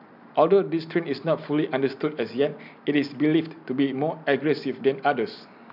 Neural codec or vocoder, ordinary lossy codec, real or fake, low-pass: none; none; real; 5.4 kHz